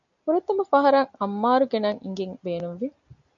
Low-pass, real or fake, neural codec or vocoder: 7.2 kHz; real; none